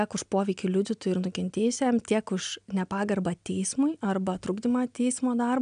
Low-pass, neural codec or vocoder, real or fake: 9.9 kHz; none; real